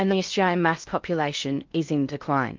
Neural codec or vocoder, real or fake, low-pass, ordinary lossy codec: codec, 16 kHz in and 24 kHz out, 0.6 kbps, FocalCodec, streaming, 2048 codes; fake; 7.2 kHz; Opus, 24 kbps